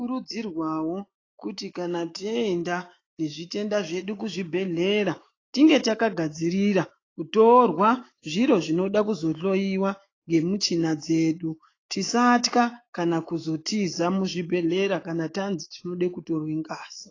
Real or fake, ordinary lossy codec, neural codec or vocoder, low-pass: fake; AAC, 32 kbps; autoencoder, 48 kHz, 128 numbers a frame, DAC-VAE, trained on Japanese speech; 7.2 kHz